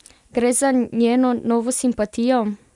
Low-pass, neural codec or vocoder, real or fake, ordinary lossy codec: 10.8 kHz; none; real; none